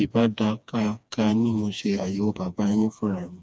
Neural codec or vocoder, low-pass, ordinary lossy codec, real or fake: codec, 16 kHz, 2 kbps, FreqCodec, smaller model; none; none; fake